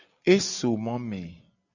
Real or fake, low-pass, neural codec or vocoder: real; 7.2 kHz; none